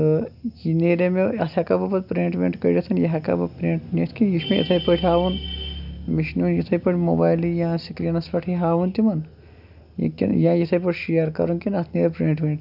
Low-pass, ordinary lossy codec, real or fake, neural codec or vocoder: 5.4 kHz; none; real; none